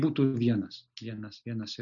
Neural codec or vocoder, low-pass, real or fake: none; 7.2 kHz; real